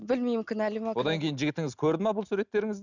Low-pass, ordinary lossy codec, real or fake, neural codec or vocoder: 7.2 kHz; none; real; none